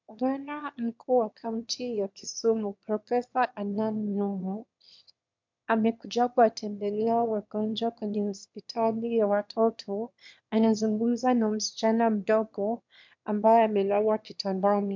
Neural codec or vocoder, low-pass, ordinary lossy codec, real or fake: autoencoder, 22.05 kHz, a latent of 192 numbers a frame, VITS, trained on one speaker; 7.2 kHz; MP3, 64 kbps; fake